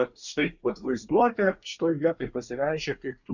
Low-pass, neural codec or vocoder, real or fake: 7.2 kHz; codec, 24 kHz, 1 kbps, SNAC; fake